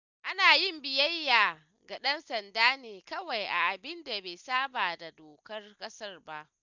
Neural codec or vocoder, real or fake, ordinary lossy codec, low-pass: none; real; none; 7.2 kHz